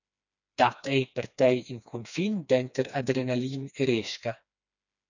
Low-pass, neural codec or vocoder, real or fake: 7.2 kHz; codec, 16 kHz, 2 kbps, FreqCodec, smaller model; fake